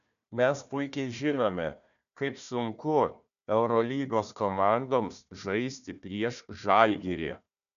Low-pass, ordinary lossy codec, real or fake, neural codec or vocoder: 7.2 kHz; MP3, 96 kbps; fake; codec, 16 kHz, 1 kbps, FunCodec, trained on Chinese and English, 50 frames a second